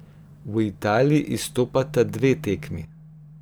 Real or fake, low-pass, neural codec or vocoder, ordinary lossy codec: fake; none; vocoder, 44.1 kHz, 128 mel bands every 512 samples, BigVGAN v2; none